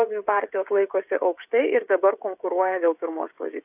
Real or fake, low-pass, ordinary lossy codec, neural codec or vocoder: fake; 3.6 kHz; AAC, 32 kbps; codec, 16 kHz, 16 kbps, FreqCodec, smaller model